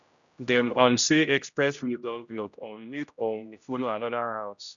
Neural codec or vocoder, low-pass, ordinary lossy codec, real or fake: codec, 16 kHz, 0.5 kbps, X-Codec, HuBERT features, trained on general audio; 7.2 kHz; none; fake